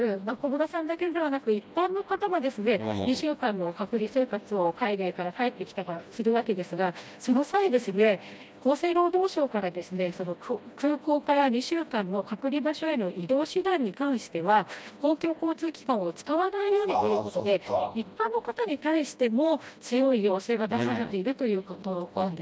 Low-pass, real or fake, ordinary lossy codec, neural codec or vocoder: none; fake; none; codec, 16 kHz, 1 kbps, FreqCodec, smaller model